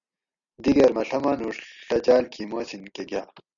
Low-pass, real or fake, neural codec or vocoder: 7.2 kHz; real; none